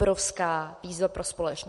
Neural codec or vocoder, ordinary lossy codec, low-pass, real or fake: none; MP3, 48 kbps; 14.4 kHz; real